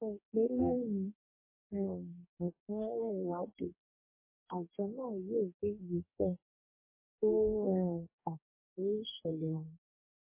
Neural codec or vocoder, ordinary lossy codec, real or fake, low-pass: codec, 44.1 kHz, 2.6 kbps, DAC; MP3, 16 kbps; fake; 3.6 kHz